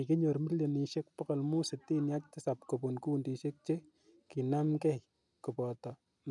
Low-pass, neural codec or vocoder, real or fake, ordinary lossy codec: 10.8 kHz; none; real; none